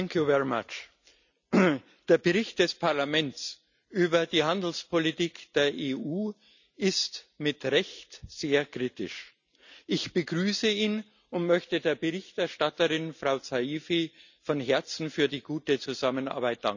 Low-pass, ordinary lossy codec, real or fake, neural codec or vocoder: 7.2 kHz; none; real; none